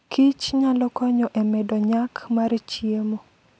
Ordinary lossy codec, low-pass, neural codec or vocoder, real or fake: none; none; none; real